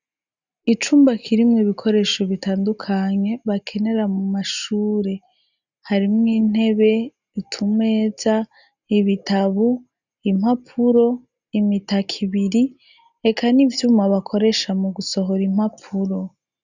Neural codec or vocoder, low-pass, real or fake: none; 7.2 kHz; real